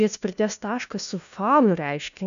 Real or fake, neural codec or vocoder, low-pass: fake; codec, 16 kHz, 1 kbps, FunCodec, trained on LibriTTS, 50 frames a second; 7.2 kHz